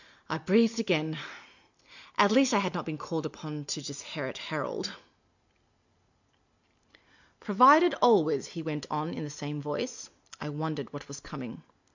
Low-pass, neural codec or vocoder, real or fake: 7.2 kHz; none; real